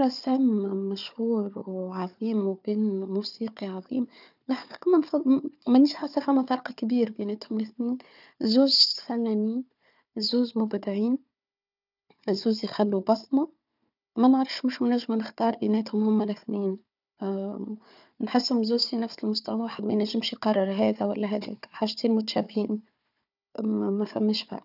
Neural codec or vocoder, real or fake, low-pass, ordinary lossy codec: codec, 16 kHz, 4 kbps, FunCodec, trained on Chinese and English, 50 frames a second; fake; 5.4 kHz; none